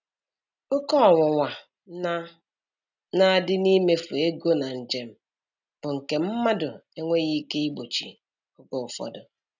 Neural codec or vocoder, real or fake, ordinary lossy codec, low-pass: none; real; none; 7.2 kHz